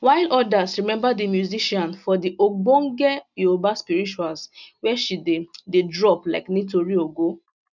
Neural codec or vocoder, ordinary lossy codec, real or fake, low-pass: none; none; real; 7.2 kHz